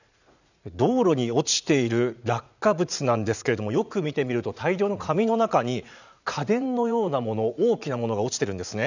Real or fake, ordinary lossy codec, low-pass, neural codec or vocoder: fake; none; 7.2 kHz; vocoder, 44.1 kHz, 80 mel bands, Vocos